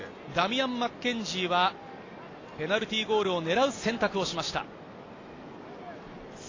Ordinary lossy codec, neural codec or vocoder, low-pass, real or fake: AAC, 32 kbps; none; 7.2 kHz; real